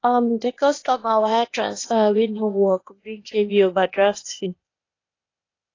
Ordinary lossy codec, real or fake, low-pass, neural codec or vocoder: AAC, 32 kbps; fake; 7.2 kHz; codec, 16 kHz, 0.8 kbps, ZipCodec